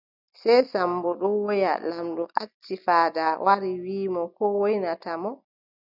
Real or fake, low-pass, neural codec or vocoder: real; 5.4 kHz; none